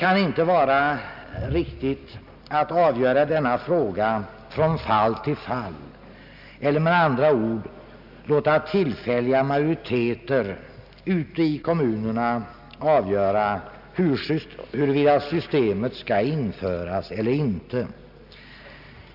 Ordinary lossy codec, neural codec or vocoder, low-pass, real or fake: none; none; 5.4 kHz; real